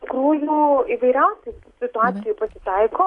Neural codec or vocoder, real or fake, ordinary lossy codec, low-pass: none; real; AAC, 48 kbps; 10.8 kHz